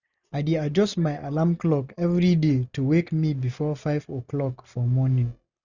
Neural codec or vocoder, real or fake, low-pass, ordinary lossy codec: none; real; 7.2 kHz; none